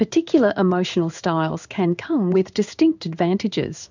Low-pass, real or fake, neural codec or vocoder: 7.2 kHz; fake; codec, 16 kHz in and 24 kHz out, 1 kbps, XY-Tokenizer